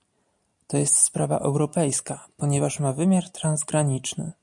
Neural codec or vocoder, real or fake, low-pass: none; real; 10.8 kHz